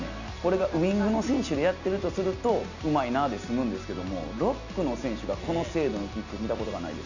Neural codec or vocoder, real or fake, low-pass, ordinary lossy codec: none; real; 7.2 kHz; none